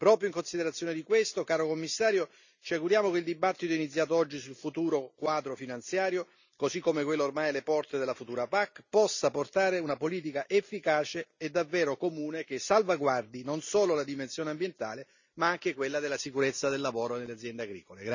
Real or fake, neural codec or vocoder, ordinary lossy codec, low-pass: real; none; none; 7.2 kHz